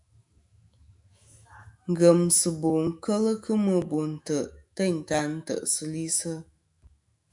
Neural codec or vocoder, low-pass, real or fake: autoencoder, 48 kHz, 128 numbers a frame, DAC-VAE, trained on Japanese speech; 10.8 kHz; fake